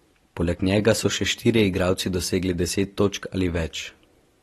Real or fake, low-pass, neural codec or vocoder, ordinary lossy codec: real; 19.8 kHz; none; AAC, 32 kbps